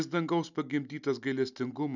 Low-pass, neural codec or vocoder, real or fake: 7.2 kHz; none; real